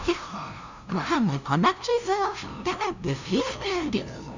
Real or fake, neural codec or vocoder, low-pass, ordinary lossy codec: fake; codec, 16 kHz, 0.5 kbps, FunCodec, trained on LibriTTS, 25 frames a second; 7.2 kHz; none